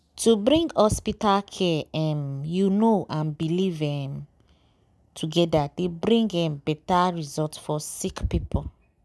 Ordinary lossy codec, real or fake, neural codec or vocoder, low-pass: none; real; none; none